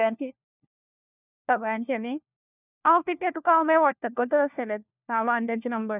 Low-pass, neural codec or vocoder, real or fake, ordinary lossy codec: 3.6 kHz; codec, 16 kHz, 1 kbps, FunCodec, trained on LibriTTS, 50 frames a second; fake; none